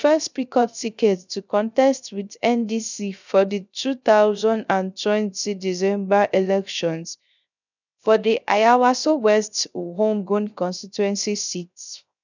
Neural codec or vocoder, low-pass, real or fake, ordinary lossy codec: codec, 16 kHz, 0.3 kbps, FocalCodec; 7.2 kHz; fake; none